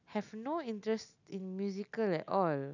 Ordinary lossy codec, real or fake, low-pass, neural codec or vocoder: none; real; 7.2 kHz; none